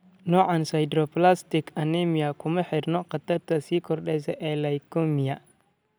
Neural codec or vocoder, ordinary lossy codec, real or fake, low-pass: none; none; real; none